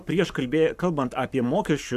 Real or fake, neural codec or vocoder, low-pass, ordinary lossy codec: fake; codec, 44.1 kHz, 7.8 kbps, Pupu-Codec; 14.4 kHz; AAC, 96 kbps